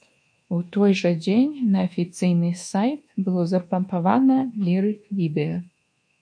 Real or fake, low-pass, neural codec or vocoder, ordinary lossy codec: fake; 9.9 kHz; codec, 24 kHz, 1.2 kbps, DualCodec; MP3, 48 kbps